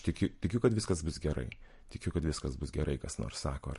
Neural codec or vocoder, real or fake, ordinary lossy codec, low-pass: none; real; MP3, 48 kbps; 14.4 kHz